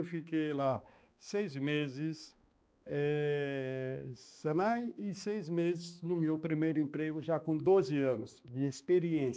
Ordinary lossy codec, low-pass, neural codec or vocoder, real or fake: none; none; codec, 16 kHz, 2 kbps, X-Codec, HuBERT features, trained on balanced general audio; fake